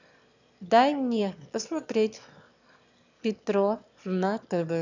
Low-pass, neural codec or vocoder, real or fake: 7.2 kHz; autoencoder, 22.05 kHz, a latent of 192 numbers a frame, VITS, trained on one speaker; fake